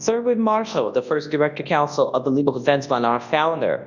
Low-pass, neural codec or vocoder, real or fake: 7.2 kHz; codec, 24 kHz, 0.9 kbps, WavTokenizer, large speech release; fake